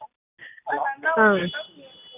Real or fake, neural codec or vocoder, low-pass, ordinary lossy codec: real; none; 3.6 kHz; none